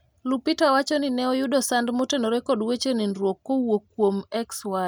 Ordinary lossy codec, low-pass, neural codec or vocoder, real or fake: none; none; none; real